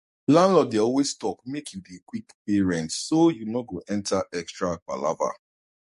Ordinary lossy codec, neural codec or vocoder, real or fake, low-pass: MP3, 48 kbps; codec, 44.1 kHz, 7.8 kbps, DAC; fake; 14.4 kHz